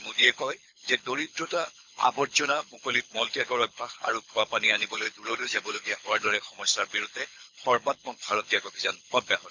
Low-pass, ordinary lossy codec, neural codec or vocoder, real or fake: 7.2 kHz; MP3, 64 kbps; codec, 24 kHz, 6 kbps, HILCodec; fake